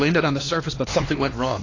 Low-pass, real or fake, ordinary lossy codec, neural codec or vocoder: 7.2 kHz; fake; AAC, 32 kbps; codec, 16 kHz, 2 kbps, X-Codec, HuBERT features, trained on LibriSpeech